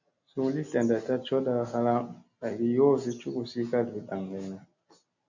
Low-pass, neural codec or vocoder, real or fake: 7.2 kHz; none; real